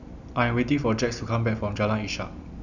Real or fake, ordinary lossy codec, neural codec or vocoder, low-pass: real; none; none; 7.2 kHz